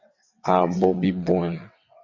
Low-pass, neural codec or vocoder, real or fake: 7.2 kHz; vocoder, 22.05 kHz, 80 mel bands, WaveNeXt; fake